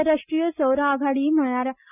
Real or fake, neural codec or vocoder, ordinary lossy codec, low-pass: real; none; none; 3.6 kHz